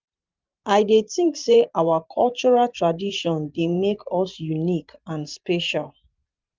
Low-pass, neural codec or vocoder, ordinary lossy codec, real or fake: 7.2 kHz; vocoder, 44.1 kHz, 128 mel bands every 512 samples, BigVGAN v2; Opus, 24 kbps; fake